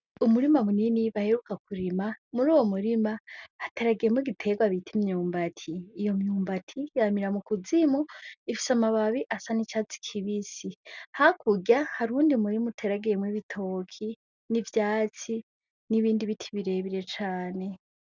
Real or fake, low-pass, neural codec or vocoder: real; 7.2 kHz; none